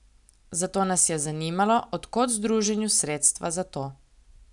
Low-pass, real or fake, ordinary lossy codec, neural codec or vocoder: 10.8 kHz; real; none; none